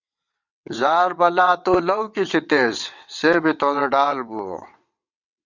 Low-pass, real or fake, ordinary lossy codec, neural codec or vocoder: 7.2 kHz; fake; Opus, 64 kbps; vocoder, 22.05 kHz, 80 mel bands, WaveNeXt